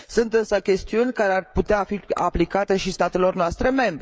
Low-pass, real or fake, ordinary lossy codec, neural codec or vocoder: none; fake; none; codec, 16 kHz, 16 kbps, FreqCodec, smaller model